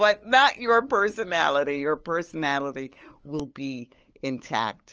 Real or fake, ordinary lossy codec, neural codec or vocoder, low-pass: fake; Opus, 24 kbps; codec, 16 kHz, 8 kbps, FunCodec, trained on LibriTTS, 25 frames a second; 7.2 kHz